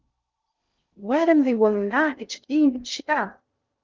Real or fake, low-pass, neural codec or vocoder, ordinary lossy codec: fake; 7.2 kHz; codec, 16 kHz in and 24 kHz out, 0.6 kbps, FocalCodec, streaming, 4096 codes; Opus, 24 kbps